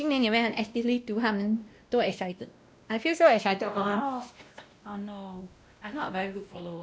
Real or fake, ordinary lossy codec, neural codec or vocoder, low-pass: fake; none; codec, 16 kHz, 1 kbps, X-Codec, WavLM features, trained on Multilingual LibriSpeech; none